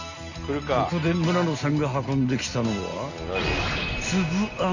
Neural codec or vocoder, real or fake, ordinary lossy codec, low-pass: none; real; Opus, 64 kbps; 7.2 kHz